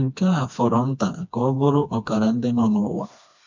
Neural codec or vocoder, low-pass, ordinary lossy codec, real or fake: codec, 16 kHz, 2 kbps, FreqCodec, smaller model; 7.2 kHz; MP3, 64 kbps; fake